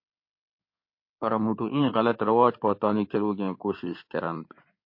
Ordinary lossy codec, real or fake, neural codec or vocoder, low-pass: MP3, 32 kbps; fake; codec, 16 kHz in and 24 kHz out, 2.2 kbps, FireRedTTS-2 codec; 5.4 kHz